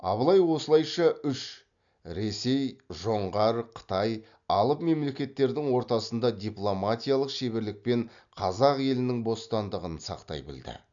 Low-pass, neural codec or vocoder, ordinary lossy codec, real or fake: 7.2 kHz; none; none; real